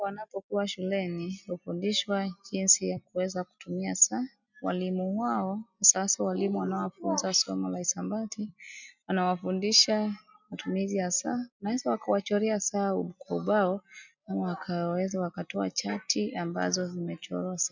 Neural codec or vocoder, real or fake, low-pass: none; real; 7.2 kHz